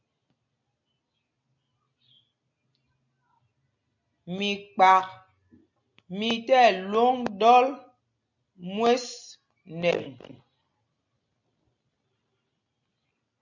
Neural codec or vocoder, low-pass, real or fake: none; 7.2 kHz; real